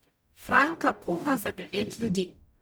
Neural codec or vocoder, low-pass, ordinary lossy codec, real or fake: codec, 44.1 kHz, 0.9 kbps, DAC; none; none; fake